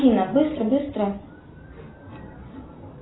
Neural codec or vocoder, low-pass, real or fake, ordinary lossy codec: none; 7.2 kHz; real; AAC, 16 kbps